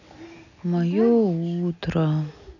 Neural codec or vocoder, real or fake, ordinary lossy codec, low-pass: none; real; none; 7.2 kHz